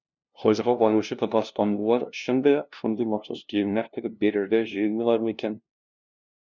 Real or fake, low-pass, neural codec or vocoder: fake; 7.2 kHz; codec, 16 kHz, 0.5 kbps, FunCodec, trained on LibriTTS, 25 frames a second